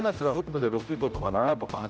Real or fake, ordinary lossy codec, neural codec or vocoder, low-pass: fake; none; codec, 16 kHz, 0.5 kbps, X-Codec, HuBERT features, trained on general audio; none